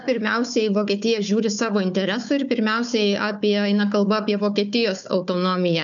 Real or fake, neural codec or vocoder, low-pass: fake; codec, 16 kHz, 4 kbps, FunCodec, trained on Chinese and English, 50 frames a second; 7.2 kHz